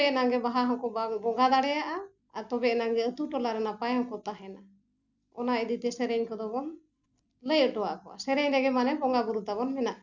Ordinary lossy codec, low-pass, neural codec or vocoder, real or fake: none; 7.2 kHz; none; real